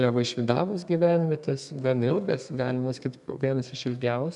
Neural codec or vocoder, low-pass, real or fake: codec, 32 kHz, 1.9 kbps, SNAC; 10.8 kHz; fake